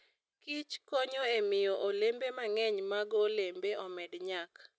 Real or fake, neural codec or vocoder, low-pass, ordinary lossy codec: real; none; none; none